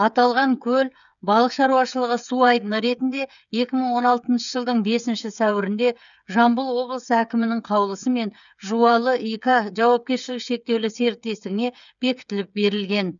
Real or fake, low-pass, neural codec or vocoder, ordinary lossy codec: fake; 7.2 kHz; codec, 16 kHz, 8 kbps, FreqCodec, smaller model; none